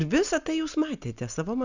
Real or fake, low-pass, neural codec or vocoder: real; 7.2 kHz; none